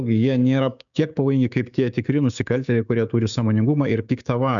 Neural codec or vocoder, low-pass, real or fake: codec, 16 kHz, 6 kbps, DAC; 7.2 kHz; fake